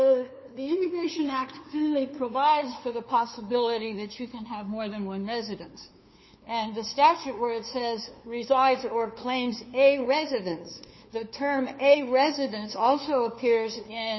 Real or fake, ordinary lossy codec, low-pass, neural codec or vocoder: fake; MP3, 24 kbps; 7.2 kHz; codec, 16 kHz, 2 kbps, FunCodec, trained on LibriTTS, 25 frames a second